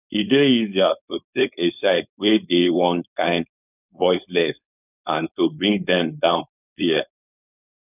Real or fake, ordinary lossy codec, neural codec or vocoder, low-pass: fake; none; codec, 16 kHz, 4.8 kbps, FACodec; 3.6 kHz